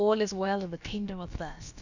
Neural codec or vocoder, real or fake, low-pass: codec, 16 kHz, about 1 kbps, DyCAST, with the encoder's durations; fake; 7.2 kHz